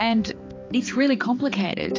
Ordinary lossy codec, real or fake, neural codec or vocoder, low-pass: AAC, 32 kbps; fake; codec, 16 kHz, 4 kbps, X-Codec, HuBERT features, trained on balanced general audio; 7.2 kHz